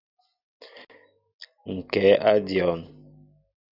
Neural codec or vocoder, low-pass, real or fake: none; 5.4 kHz; real